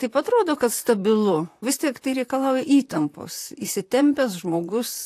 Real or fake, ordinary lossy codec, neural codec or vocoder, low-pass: fake; AAC, 64 kbps; vocoder, 44.1 kHz, 128 mel bands, Pupu-Vocoder; 14.4 kHz